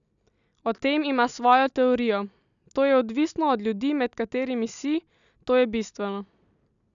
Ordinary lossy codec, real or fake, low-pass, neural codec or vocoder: none; real; 7.2 kHz; none